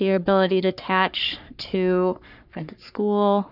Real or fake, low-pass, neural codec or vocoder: fake; 5.4 kHz; codec, 44.1 kHz, 3.4 kbps, Pupu-Codec